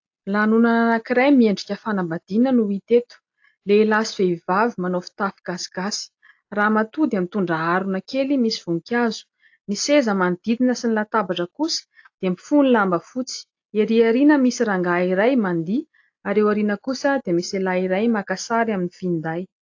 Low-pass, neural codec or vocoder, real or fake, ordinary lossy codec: 7.2 kHz; none; real; AAC, 48 kbps